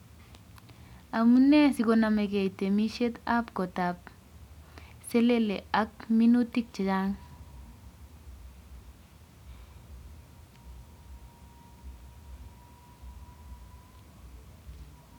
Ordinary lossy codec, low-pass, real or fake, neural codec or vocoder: none; 19.8 kHz; real; none